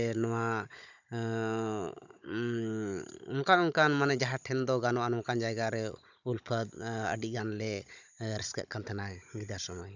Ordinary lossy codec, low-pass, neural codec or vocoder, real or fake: none; 7.2 kHz; codec, 16 kHz, 16 kbps, FunCodec, trained on Chinese and English, 50 frames a second; fake